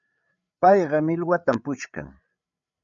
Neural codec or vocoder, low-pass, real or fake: codec, 16 kHz, 8 kbps, FreqCodec, larger model; 7.2 kHz; fake